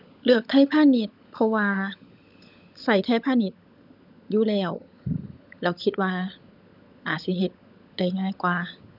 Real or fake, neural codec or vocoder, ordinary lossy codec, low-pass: fake; codec, 16 kHz, 16 kbps, FunCodec, trained on LibriTTS, 50 frames a second; none; 5.4 kHz